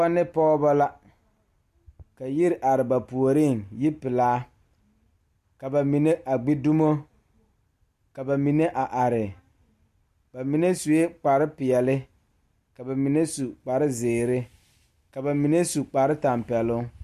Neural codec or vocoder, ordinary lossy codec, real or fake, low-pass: none; AAC, 96 kbps; real; 14.4 kHz